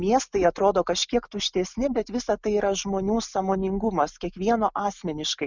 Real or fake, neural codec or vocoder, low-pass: real; none; 7.2 kHz